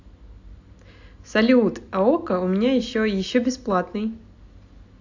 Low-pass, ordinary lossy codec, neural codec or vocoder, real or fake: 7.2 kHz; AAC, 48 kbps; none; real